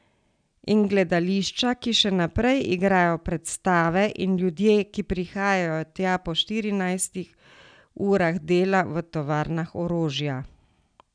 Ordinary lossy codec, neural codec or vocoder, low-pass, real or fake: none; none; 9.9 kHz; real